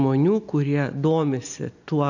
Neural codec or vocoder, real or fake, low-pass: none; real; 7.2 kHz